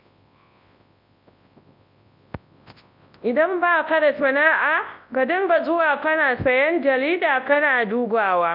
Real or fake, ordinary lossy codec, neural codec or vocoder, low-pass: fake; MP3, 48 kbps; codec, 24 kHz, 0.9 kbps, WavTokenizer, large speech release; 5.4 kHz